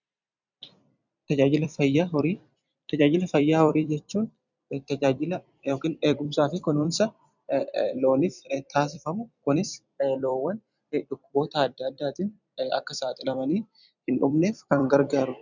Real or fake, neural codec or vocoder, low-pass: fake; vocoder, 44.1 kHz, 128 mel bands every 256 samples, BigVGAN v2; 7.2 kHz